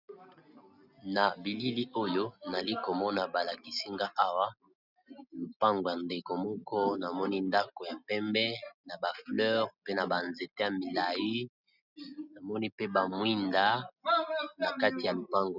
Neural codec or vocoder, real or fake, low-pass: none; real; 5.4 kHz